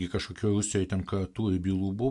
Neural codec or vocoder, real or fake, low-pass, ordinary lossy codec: none; real; 10.8 kHz; MP3, 64 kbps